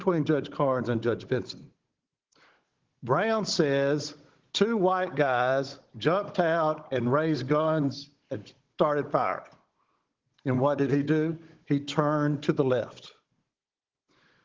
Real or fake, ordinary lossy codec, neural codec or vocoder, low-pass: fake; Opus, 16 kbps; codec, 16 kHz, 16 kbps, FunCodec, trained on Chinese and English, 50 frames a second; 7.2 kHz